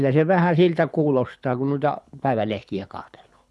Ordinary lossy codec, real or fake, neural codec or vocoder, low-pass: none; fake; codec, 24 kHz, 6 kbps, HILCodec; none